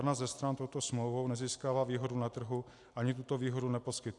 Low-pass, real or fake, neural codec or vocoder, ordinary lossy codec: 10.8 kHz; real; none; AAC, 64 kbps